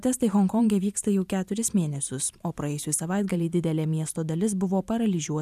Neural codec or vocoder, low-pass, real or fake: vocoder, 44.1 kHz, 128 mel bands every 512 samples, BigVGAN v2; 14.4 kHz; fake